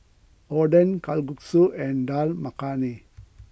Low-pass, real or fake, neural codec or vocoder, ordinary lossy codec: none; real; none; none